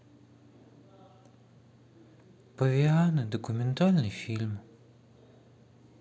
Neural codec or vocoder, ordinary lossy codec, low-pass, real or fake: none; none; none; real